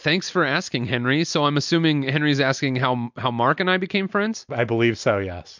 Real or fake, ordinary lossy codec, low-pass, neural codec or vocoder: real; MP3, 64 kbps; 7.2 kHz; none